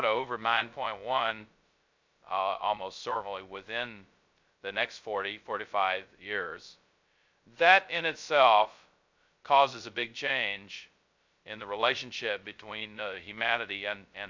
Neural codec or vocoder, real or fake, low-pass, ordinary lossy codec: codec, 16 kHz, 0.2 kbps, FocalCodec; fake; 7.2 kHz; MP3, 64 kbps